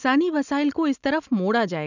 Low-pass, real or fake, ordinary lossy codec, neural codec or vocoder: 7.2 kHz; real; none; none